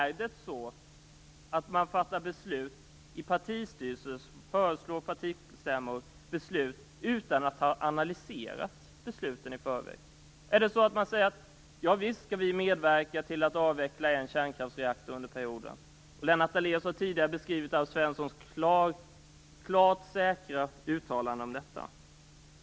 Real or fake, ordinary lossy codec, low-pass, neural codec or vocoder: real; none; none; none